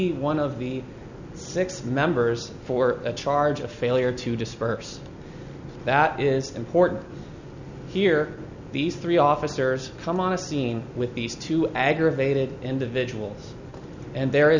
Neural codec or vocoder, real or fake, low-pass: none; real; 7.2 kHz